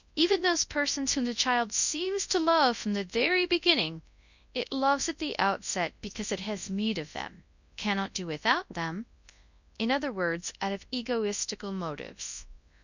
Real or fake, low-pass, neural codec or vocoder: fake; 7.2 kHz; codec, 24 kHz, 0.9 kbps, WavTokenizer, large speech release